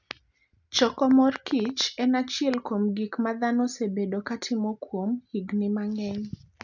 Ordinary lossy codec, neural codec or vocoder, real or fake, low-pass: none; none; real; 7.2 kHz